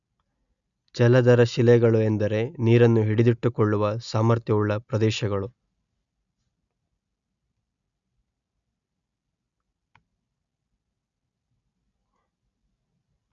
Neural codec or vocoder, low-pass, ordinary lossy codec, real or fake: none; 7.2 kHz; none; real